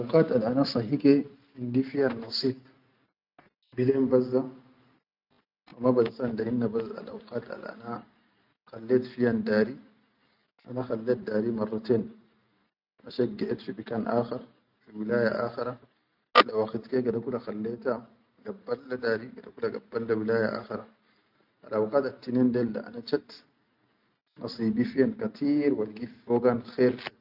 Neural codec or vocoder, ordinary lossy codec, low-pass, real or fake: none; none; 5.4 kHz; real